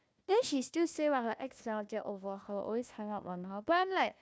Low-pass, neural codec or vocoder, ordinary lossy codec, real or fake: none; codec, 16 kHz, 1 kbps, FunCodec, trained on Chinese and English, 50 frames a second; none; fake